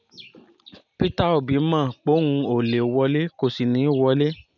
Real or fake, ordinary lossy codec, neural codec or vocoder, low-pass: real; none; none; 7.2 kHz